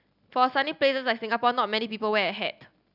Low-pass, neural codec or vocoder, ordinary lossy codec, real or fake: 5.4 kHz; none; none; real